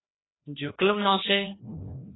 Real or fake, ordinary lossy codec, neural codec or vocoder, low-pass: fake; AAC, 16 kbps; codec, 16 kHz, 1 kbps, FreqCodec, larger model; 7.2 kHz